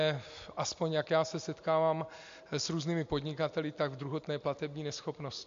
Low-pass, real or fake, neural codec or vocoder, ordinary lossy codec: 7.2 kHz; real; none; MP3, 48 kbps